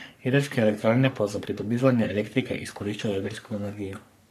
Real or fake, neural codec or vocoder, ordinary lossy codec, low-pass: fake; codec, 44.1 kHz, 3.4 kbps, Pupu-Codec; none; 14.4 kHz